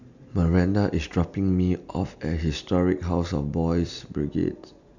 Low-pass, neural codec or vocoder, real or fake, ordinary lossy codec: 7.2 kHz; none; real; none